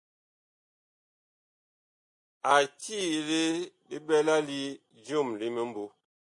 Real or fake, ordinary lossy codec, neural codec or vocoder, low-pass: real; MP3, 48 kbps; none; 10.8 kHz